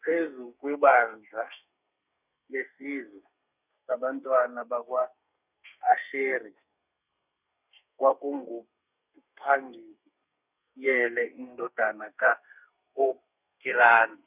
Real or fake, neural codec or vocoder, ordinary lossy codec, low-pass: fake; codec, 32 kHz, 1.9 kbps, SNAC; none; 3.6 kHz